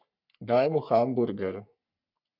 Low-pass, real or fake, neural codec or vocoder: 5.4 kHz; fake; codec, 44.1 kHz, 3.4 kbps, Pupu-Codec